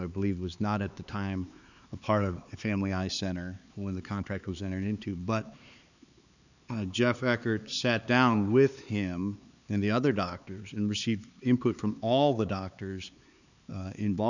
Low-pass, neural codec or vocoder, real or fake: 7.2 kHz; codec, 16 kHz, 4 kbps, X-Codec, HuBERT features, trained on LibriSpeech; fake